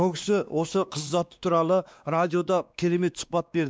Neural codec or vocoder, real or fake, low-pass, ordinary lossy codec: codec, 16 kHz, 2 kbps, X-Codec, WavLM features, trained on Multilingual LibriSpeech; fake; none; none